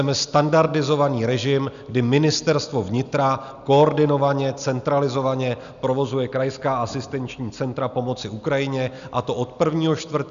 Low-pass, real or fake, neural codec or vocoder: 7.2 kHz; real; none